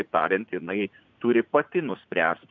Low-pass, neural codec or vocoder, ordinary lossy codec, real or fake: 7.2 kHz; vocoder, 44.1 kHz, 80 mel bands, Vocos; MP3, 64 kbps; fake